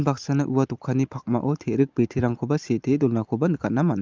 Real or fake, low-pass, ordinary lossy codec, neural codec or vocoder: real; 7.2 kHz; Opus, 32 kbps; none